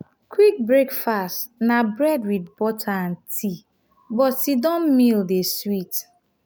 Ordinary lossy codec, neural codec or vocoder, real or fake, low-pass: none; none; real; none